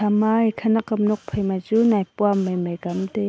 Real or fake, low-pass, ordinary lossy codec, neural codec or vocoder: real; none; none; none